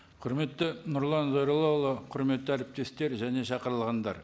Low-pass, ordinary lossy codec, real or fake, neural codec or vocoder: none; none; real; none